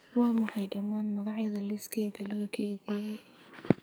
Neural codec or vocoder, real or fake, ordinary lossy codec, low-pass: codec, 44.1 kHz, 2.6 kbps, SNAC; fake; none; none